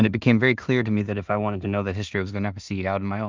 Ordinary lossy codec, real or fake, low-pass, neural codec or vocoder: Opus, 24 kbps; fake; 7.2 kHz; codec, 16 kHz in and 24 kHz out, 0.4 kbps, LongCat-Audio-Codec, two codebook decoder